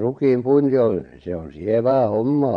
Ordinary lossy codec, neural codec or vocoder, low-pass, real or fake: MP3, 48 kbps; vocoder, 22.05 kHz, 80 mel bands, Vocos; 9.9 kHz; fake